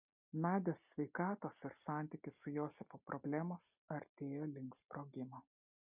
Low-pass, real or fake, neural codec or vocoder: 3.6 kHz; real; none